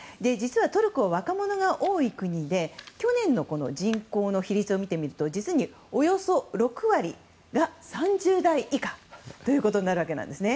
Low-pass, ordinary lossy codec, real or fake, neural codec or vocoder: none; none; real; none